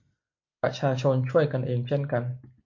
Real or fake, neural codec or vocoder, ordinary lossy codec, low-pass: real; none; MP3, 48 kbps; 7.2 kHz